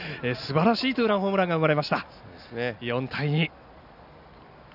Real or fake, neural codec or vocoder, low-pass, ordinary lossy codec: real; none; 5.4 kHz; none